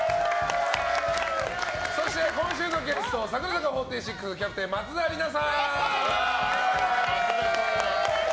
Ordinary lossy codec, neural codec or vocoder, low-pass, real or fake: none; none; none; real